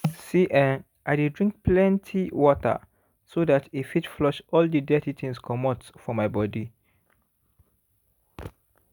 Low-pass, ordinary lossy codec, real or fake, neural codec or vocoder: none; none; real; none